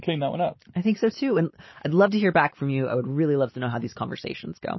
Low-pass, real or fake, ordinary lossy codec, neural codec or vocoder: 7.2 kHz; real; MP3, 24 kbps; none